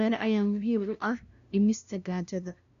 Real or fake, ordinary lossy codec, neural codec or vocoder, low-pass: fake; none; codec, 16 kHz, 0.5 kbps, FunCodec, trained on LibriTTS, 25 frames a second; 7.2 kHz